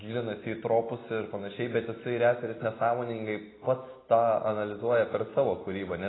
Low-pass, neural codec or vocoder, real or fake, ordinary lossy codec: 7.2 kHz; none; real; AAC, 16 kbps